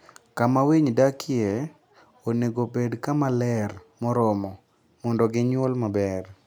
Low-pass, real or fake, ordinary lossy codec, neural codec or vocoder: none; real; none; none